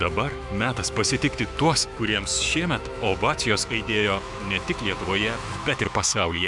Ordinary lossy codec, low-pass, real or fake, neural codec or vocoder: MP3, 96 kbps; 10.8 kHz; fake; autoencoder, 48 kHz, 128 numbers a frame, DAC-VAE, trained on Japanese speech